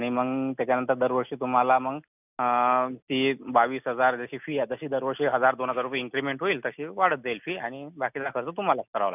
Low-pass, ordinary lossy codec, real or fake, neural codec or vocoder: 3.6 kHz; none; real; none